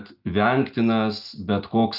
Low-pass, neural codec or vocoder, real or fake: 5.4 kHz; none; real